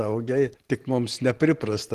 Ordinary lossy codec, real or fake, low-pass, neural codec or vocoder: Opus, 16 kbps; fake; 14.4 kHz; vocoder, 44.1 kHz, 128 mel bands every 512 samples, BigVGAN v2